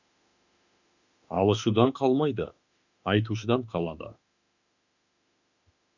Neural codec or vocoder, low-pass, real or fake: autoencoder, 48 kHz, 32 numbers a frame, DAC-VAE, trained on Japanese speech; 7.2 kHz; fake